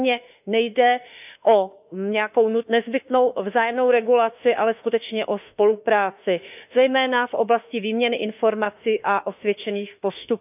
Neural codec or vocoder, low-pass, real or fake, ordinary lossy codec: autoencoder, 48 kHz, 32 numbers a frame, DAC-VAE, trained on Japanese speech; 3.6 kHz; fake; none